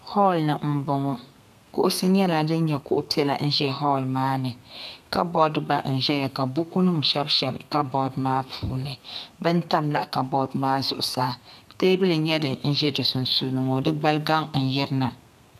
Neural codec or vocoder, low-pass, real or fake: codec, 32 kHz, 1.9 kbps, SNAC; 14.4 kHz; fake